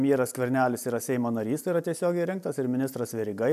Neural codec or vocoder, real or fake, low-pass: none; real; 14.4 kHz